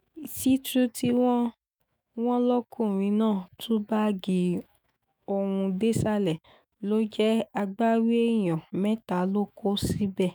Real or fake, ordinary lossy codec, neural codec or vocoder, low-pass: fake; none; autoencoder, 48 kHz, 128 numbers a frame, DAC-VAE, trained on Japanese speech; none